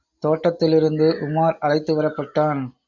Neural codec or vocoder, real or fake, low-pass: none; real; 7.2 kHz